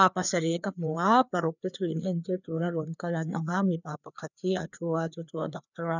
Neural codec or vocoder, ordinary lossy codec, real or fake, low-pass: codec, 16 kHz, 2 kbps, FreqCodec, larger model; none; fake; 7.2 kHz